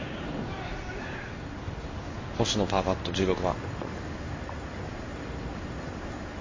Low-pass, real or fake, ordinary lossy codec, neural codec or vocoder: 7.2 kHz; fake; MP3, 32 kbps; codec, 16 kHz in and 24 kHz out, 1 kbps, XY-Tokenizer